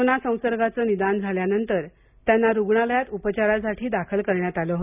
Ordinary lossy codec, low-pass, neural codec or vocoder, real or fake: none; 3.6 kHz; none; real